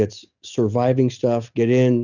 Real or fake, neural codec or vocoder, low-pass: real; none; 7.2 kHz